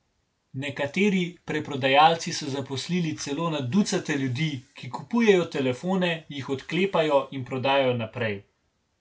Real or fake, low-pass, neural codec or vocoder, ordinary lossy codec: real; none; none; none